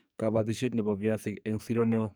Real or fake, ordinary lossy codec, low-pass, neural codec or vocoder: fake; none; none; codec, 44.1 kHz, 2.6 kbps, SNAC